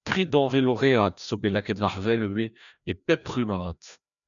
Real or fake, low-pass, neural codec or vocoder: fake; 7.2 kHz; codec, 16 kHz, 1 kbps, FreqCodec, larger model